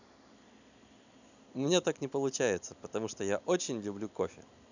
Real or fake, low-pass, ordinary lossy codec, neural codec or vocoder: real; 7.2 kHz; none; none